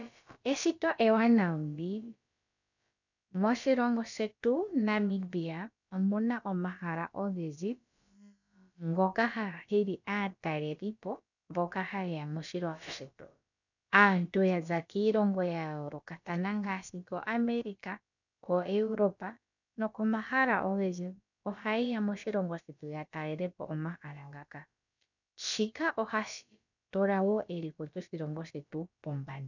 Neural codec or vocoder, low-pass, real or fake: codec, 16 kHz, about 1 kbps, DyCAST, with the encoder's durations; 7.2 kHz; fake